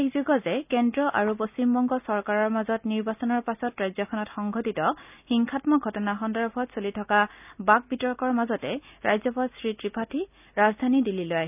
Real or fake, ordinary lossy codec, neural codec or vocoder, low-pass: real; none; none; 3.6 kHz